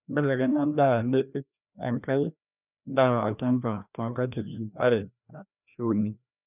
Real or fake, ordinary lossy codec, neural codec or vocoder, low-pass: fake; none; codec, 16 kHz, 1 kbps, FreqCodec, larger model; 3.6 kHz